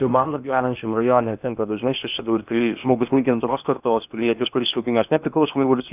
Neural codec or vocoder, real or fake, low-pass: codec, 16 kHz in and 24 kHz out, 0.8 kbps, FocalCodec, streaming, 65536 codes; fake; 3.6 kHz